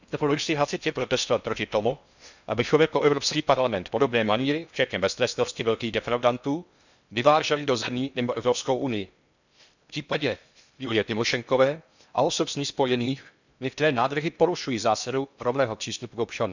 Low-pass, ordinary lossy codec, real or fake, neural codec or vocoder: 7.2 kHz; none; fake; codec, 16 kHz in and 24 kHz out, 0.6 kbps, FocalCodec, streaming, 2048 codes